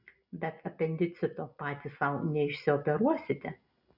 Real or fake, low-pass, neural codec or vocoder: real; 5.4 kHz; none